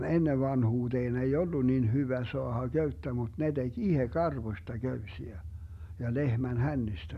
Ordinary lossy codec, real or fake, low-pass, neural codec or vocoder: none; real; 14.4 kHz; none